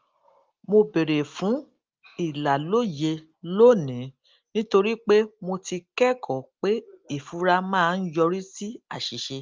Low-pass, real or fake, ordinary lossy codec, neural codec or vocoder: 7.2 kHz; real; Opus, 32 kbps; none